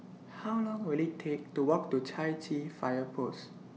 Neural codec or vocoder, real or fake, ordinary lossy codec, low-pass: none; real; none; none